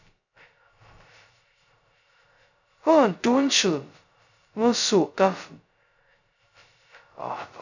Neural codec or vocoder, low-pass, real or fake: codec, 16 kHz, 0.2 kbps, FocalCodec; 7.2 kHz; fake